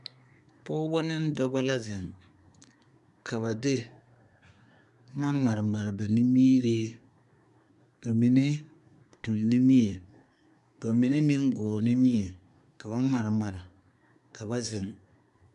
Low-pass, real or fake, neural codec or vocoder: 10.8 kHz; fake; codec, 24 kHz, 1 kbps, SNAC